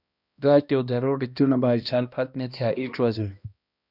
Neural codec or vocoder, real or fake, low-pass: codec, 16 kHz, 1 kbps, X-Codec, HuBERT features, trained on balanced general audio; fake; 5.4 kHz